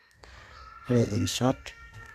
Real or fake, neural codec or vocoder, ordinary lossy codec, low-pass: fake; codec, 32 kHz, 1.9 kbps, SNAC; none; 14.4 kHz